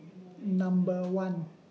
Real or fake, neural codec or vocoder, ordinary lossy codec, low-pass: real; none; none; none